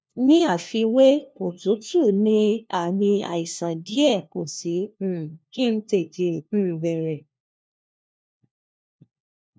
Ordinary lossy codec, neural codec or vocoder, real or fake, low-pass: none; codec, 16 kHz, 1 kbps, FunCodec, trained on LibriTTS, 50 frames a second; fake; none